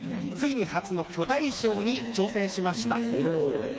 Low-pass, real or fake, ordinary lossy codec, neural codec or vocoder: none; fake; none; codec, 16 kHz, 2 kbps, FreqCodec, smaller model